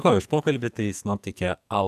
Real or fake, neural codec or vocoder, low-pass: fake; codec, 44.1 kHz, 2.6 kbps, SNAC; 14.4 kHz